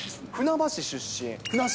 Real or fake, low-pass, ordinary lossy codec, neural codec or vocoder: real; none; none; none